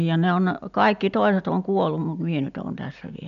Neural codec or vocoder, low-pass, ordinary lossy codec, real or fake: none; 7.2 kHz; none; real